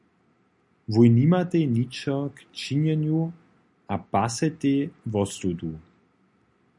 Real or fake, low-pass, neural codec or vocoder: real; 10.8 kHz; none